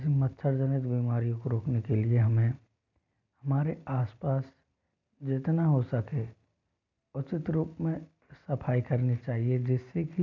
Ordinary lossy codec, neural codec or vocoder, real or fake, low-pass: none; none; real; 7.2 kHz